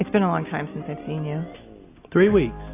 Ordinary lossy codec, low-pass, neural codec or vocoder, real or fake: AAC, 24 kbps; 3.6 kHz; none; real